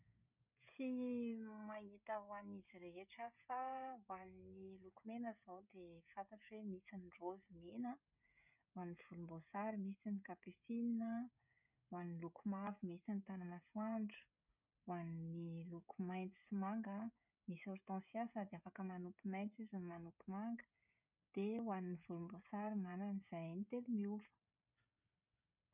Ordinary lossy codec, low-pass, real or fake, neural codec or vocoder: none; 3.6 kHz; fake; codec, 16 kHz, 16 kbps, FreqCodec, smaller model